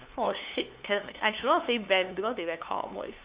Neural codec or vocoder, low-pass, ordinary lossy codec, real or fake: codec, 16 kHz, 2 kbps, FunCodec, trained on LibriTTS, 25 frames a second; 3.6 kHz; Opus, 64 kbps; fake